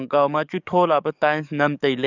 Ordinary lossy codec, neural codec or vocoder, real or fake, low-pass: none; codec, 44.1 kHz, 7.8 kbps, DAC; fake; 7.2 kHz